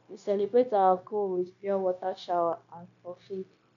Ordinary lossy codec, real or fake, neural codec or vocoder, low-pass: none; fake; codec, 16 kHz, 0.9 kbps, LongCat-Audio-Codec; 7.2 kHz